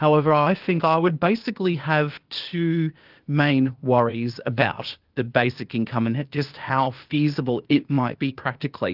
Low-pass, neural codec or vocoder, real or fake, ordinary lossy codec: 5.4 kHz; codec, 16 kHz, 0.8 kbps, ZipCodec; fake; Opus, 32 kbps